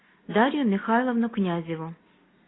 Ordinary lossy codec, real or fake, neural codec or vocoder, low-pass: AAC, 16 kbps; real; none; 7.2 kHz